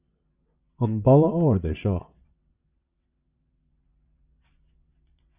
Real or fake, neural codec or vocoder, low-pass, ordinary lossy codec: fake; vocoder, 22.05 kHz, 80 mel bands, WaveNeXt; 3.6 kHz; Opus, 64 kbps